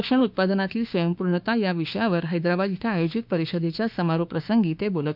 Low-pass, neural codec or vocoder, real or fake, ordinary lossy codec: 5.4 kHz; autoencoder, 48 kHz, 32 numbers a frame, DAC-VAE, trained on Japanese speech; fake; none